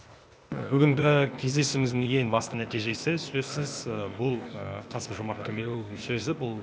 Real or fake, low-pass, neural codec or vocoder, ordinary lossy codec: fake; none; codec, 16 kHz, 0.8 kbps, ZipCodec; none